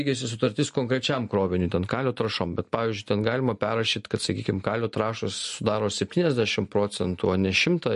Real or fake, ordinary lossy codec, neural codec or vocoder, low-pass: real; MP3, 48 kbps; none; 10.8 kHz